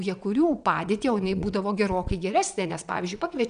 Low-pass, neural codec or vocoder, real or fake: 9.9 kHz; vocoder, 22.05 kHz, 80 mel bands, WaveNeXt; fake